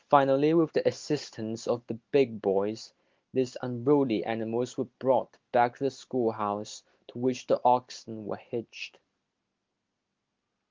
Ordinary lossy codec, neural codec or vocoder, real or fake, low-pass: Opus, 32 kbps; none; real; 7.2 kHz